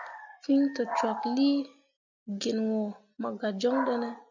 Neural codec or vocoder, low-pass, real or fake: none; 7.2 kHz; real